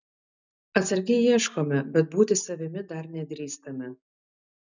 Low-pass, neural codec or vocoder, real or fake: 7.2 kHz; none; real